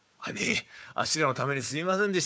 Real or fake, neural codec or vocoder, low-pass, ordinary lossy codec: fake; codec, 16 kHz, 4 kbps, FunCodec, trained on Chinese and English, 50 frames a second; none; none